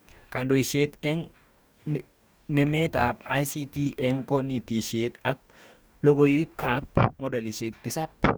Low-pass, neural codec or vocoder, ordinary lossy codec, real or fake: none; codec, 44.1 kHz, 2.6 kbps, DAC; none; fake